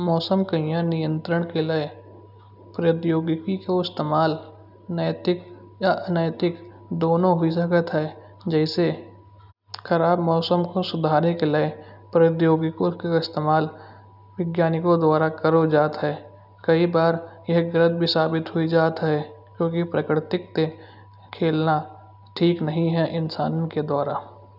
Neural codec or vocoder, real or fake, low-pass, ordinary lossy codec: none; real; 5.4 kHz; none